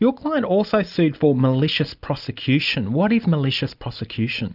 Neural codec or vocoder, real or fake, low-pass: none; real; 5.4 kHz